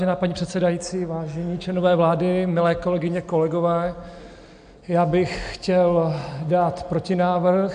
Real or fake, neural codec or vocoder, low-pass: real; none; 9.9 kHz